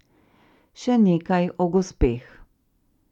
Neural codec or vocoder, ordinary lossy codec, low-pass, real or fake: none; none; 19.8 kHz; real